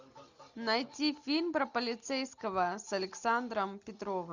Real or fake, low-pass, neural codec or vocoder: real; 7.2 kHz; none